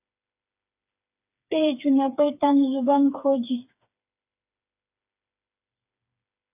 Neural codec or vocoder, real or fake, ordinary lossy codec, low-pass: codec, 16 kHz, 4 kbps, FreqCodec, smaller model; fake; AAC, 32 kbps; 3.6 kHz